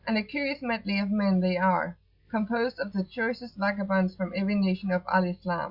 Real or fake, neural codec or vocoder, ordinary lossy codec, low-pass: real; none; Opus, 64 kbps; 5.4 kHz